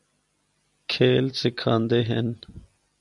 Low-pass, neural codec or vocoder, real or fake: 10.8 kHz; none; real